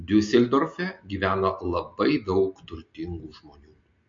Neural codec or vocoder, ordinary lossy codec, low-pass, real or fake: none; MP3, 48 kbps; 7.2 kHz; real